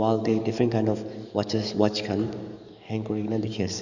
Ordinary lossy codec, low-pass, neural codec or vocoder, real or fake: none; 7.2 kHz; codec, 44.1 kHz, 7.8 kbps, DAC; fake